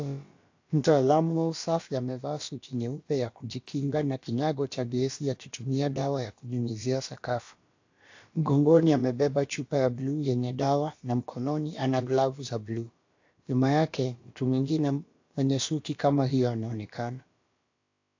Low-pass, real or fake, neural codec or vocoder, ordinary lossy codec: 7.2 kHz; fake; codec, 16 kHz, about 1 kbps, DyCAST, with the encoder's durations; AAC, 48 kbps